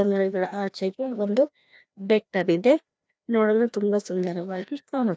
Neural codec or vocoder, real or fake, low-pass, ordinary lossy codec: codec, 16 kHz, 1 kbps, FreqCodec, larger model; fake; none; none